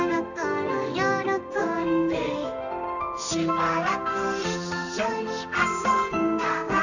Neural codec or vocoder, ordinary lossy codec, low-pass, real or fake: codec, 16 kHz in and 24 kHz out, 1 kbps, XY-Tokenizer; none; 7.2 kHz; fake